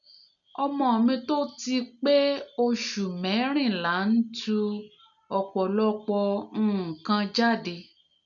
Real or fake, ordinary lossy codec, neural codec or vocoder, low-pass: real; none; none; 7.2 kHz